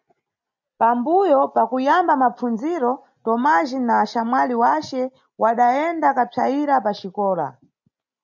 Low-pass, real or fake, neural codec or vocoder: 7.2 kHz; real; none